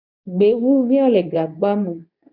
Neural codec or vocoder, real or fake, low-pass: codec, 24 kHz, 0.9 kbps, WavTokenizer, medium speech release version 2; fake; 5.4 kHz